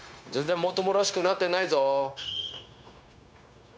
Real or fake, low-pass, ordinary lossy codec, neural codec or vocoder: fake; none; none; codec, 16 kHz, 0.9 kbps, LongCat-Audio-Codec